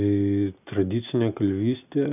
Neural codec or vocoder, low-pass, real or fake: none; 3.6 kHz; real